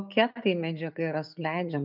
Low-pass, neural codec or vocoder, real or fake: 5.4 kHz; none; real